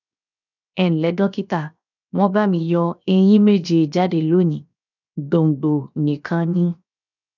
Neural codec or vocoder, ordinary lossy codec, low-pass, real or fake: codec, 16 kHz, 0.7 kbps, FocalCodec; none; 7.2 kHz; fake